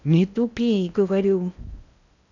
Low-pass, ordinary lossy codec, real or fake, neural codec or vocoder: 7.2 kHz; none; fake; codec, 16 kHz in and 24 kHz out, 0.8 kbps, FocalCodec, streaming, 65536 codes